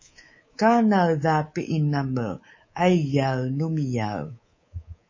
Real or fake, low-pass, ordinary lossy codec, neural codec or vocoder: fake; 7.2 kHz; MP3, 32 kbps; codec, 24 kHz, 3.1 kbps, DualCodec